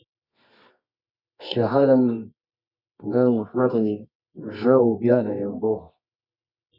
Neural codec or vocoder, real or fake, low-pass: codec, 24 kHz, 0.9 kbps, WavTokenizer, medium music audio release; fake; 5.4 kHz